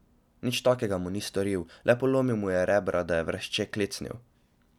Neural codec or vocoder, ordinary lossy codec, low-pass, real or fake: none; none; 19.8 kHz; real